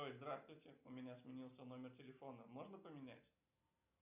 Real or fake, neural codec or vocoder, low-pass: real; none; 3.6 kHz